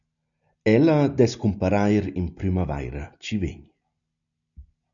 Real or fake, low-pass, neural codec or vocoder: real; 7.2 kHz; none